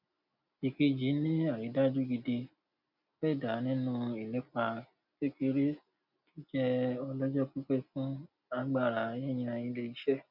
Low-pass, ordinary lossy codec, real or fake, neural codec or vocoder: 5.4 kHz; none; real; none